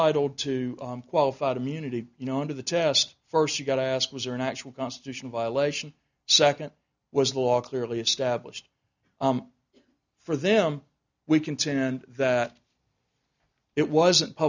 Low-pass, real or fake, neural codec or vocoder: 7.2 kHz; real; none